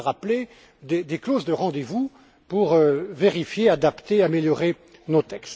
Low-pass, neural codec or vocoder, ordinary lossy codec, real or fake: none; none; none; real